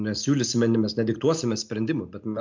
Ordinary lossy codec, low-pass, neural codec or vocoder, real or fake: MP3, 64 kbps; 7.2 kHz; none; real